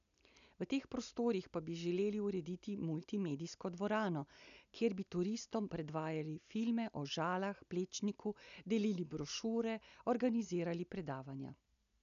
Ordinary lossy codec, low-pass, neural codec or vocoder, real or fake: none; 7.2 kHz; none; real